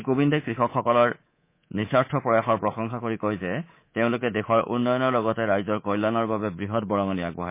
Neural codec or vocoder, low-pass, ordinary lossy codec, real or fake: codec, 24 kHz, 3.1 kbps, DualCodec; 3.6 kHz; MP3, 24 kbps; fake